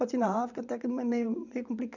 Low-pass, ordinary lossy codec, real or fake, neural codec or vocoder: 7.2 kHz; none; fake; vocoder, 22.05 kHz, 80 mel bands, WaveNeXt